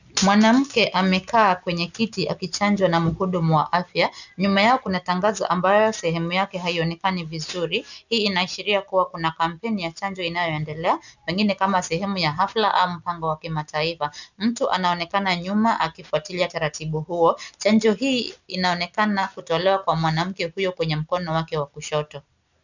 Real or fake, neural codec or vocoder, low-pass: real; none; 7.2 kHz